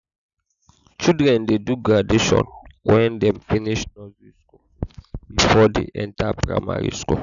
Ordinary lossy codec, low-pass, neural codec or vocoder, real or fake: none; 7.2 kHz; none; real